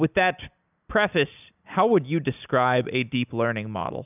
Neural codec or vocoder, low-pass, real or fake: none; 3.6 kHz; real